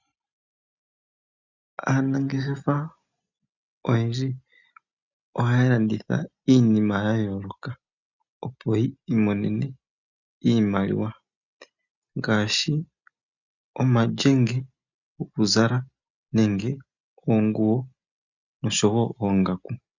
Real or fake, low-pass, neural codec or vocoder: real; 7.2 kHz; none